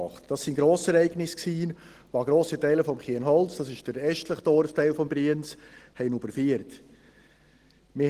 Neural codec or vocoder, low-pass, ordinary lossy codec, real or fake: none; 14.4 kHz; Opus, 16 kbps; real